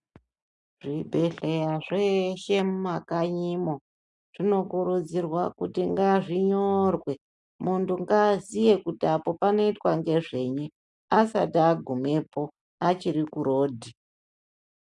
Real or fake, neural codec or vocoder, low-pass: real; none; 10.8 kHz